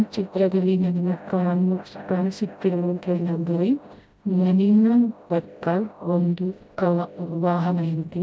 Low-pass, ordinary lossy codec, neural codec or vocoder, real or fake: none; none; codec, 16 kHz, 0.5 kbps, FreqCodec, smaller model; fake